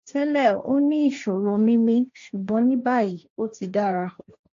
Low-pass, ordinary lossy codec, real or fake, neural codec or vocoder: 7.2 kHz; AAC, 48 kbps; fake; codec, 16 kHz, 1.1 kbps, Voila-Tokenizer